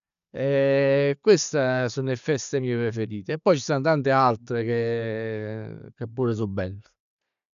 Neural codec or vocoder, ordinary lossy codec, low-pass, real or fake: none; none; 7.2 kHz; real